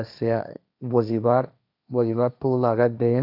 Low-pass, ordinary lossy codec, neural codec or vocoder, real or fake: 5.4 kHz; none; codec, 16 kHz, 1.1 kbps, Voila-Tokenizer; fake